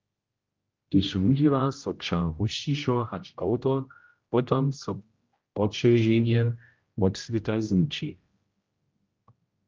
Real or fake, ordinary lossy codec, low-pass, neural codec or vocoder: fake; Opus, 24 kbps; 7.2 kHz; codec, 16 kHz, 0.5 kbps, X-Codec, HuBERT features, trained on general audio